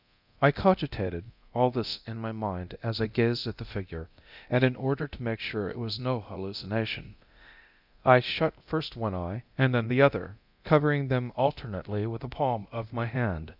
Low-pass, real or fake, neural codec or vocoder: 5.4 kHz; fake; codec, 24 kHz, 0.9 kbps, DualCodec